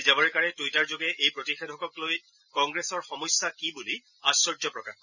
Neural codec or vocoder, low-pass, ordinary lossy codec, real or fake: none; 7.2 kHz; none; real